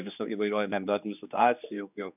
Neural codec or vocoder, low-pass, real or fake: codec, 16 kHz, 2 kbps, X-Codec, HuBERT features, trained on general audio; 3.6 kHz; fake